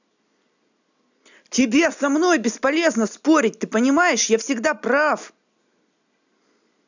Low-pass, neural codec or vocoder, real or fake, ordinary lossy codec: 7.2 kHz; none; real; none